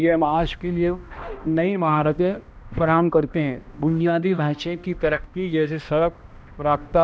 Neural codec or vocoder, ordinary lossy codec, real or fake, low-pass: codec, 16 kHz, 1 kbps, X-Codec, HuBERT features, trained on balanced general audio; none; fake; none